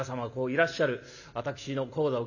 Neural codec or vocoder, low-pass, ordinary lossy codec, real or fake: none; 7.2 kHz; none; real